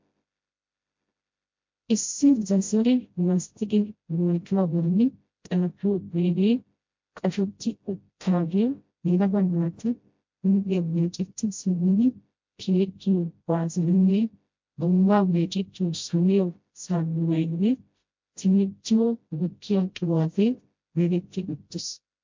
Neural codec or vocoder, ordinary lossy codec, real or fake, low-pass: codec, 16 kHz, 0.5 kbps, FreqCodec, smaller model; MP3, 48 kbps; fake; 7.2 kHz